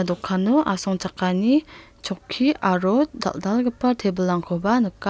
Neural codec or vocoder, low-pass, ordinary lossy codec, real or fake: none; none; none; real